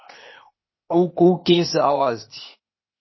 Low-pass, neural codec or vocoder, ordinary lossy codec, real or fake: 7.2 kHz; codec, 16 kHz, 0.8 kbps, ZipCodec; MP3, 24 kbps; fake